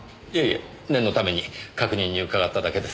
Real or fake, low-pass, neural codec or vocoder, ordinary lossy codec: real; none; none; none